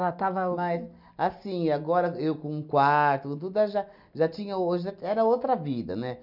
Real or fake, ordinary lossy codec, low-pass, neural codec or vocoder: real; none; 5.4 kHz; none